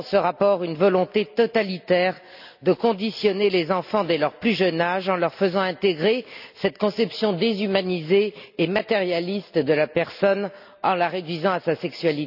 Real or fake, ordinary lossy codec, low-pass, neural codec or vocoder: real; none; 5.4 kHz; none